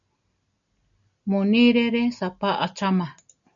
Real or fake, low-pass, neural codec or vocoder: real; 7.2 kHz; none